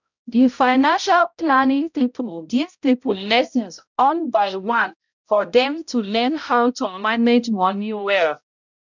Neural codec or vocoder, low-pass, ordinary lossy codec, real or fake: codec, 16 kHz, 0.5 kbps, X-Codec, HuBERT features, trained on balanced general audio; 7.2 kHz; none; fake